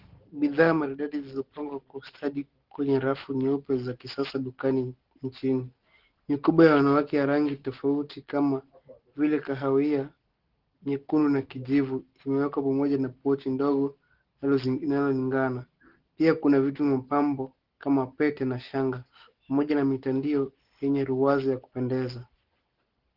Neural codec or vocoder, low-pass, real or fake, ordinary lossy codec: none; 5.4 kHz; real; Opus, 16 kbps